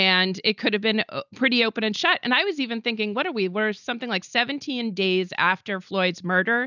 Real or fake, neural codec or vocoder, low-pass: real; none; 7.2 kHz